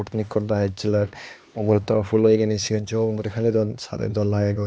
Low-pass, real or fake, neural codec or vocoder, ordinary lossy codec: none; fake; codec, 16 kHz, 2 kbps, X-Codec, HuBERT features, trained on LibriSpeech; none